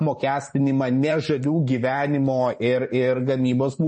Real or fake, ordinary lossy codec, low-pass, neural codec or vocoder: fake; MP3, 32 kbps; 10.8 kHz; vocoder, 44.1 kHz, 128 mel bands every 512 samples, BigVGAN v2